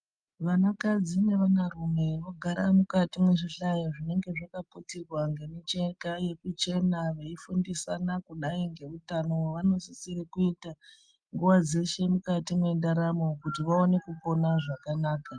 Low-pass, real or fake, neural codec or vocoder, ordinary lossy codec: 9.9 kHz; real; none; Opus, 32 kbps